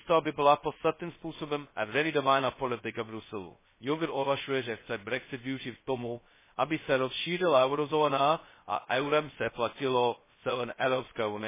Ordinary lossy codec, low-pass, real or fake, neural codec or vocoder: MP3, 16 kbps; 3.6 kHz; fake; codec, 16 kHz, 0.2 kbps, FocalCodec